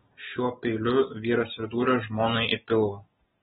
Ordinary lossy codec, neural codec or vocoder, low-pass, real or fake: AAC, 16 kbps; none; 10.8 kHz; real